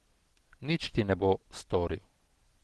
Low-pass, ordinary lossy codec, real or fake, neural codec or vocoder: 10.8 kHz; Opus, 16 kbps; real; none